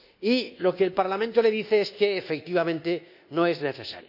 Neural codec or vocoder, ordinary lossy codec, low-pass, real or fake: codec, 24 kHz, 1.2 kbps, DualCodec; none; 5.4 kHz; fake